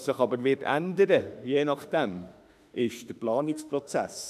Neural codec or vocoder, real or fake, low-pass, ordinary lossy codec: autoencoder, 48 kHz, 32 numbers a frame, DAC-VAE, trained on Japanese speech; fake; 14.4 kHz; none